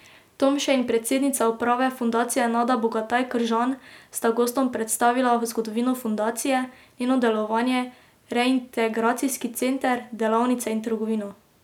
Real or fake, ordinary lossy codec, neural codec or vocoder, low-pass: real; none; none; 19.8 kHz